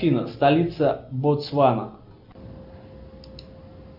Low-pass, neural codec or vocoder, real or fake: 5.4 kHz; none; real